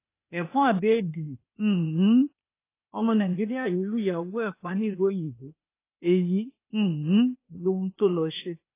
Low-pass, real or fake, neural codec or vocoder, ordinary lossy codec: 3.6 kHz; fake; codec, 16 kHz, 0.8 kbps, ZipCodec; AAC, 24 kbps